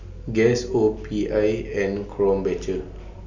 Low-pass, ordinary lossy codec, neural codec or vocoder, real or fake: 7.2 kHz; none; none; real